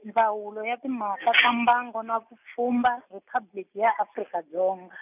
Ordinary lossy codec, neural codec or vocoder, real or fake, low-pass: none; none; real; 3.6 kHz